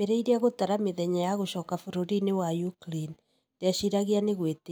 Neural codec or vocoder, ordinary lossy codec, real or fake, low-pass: none; none; real; none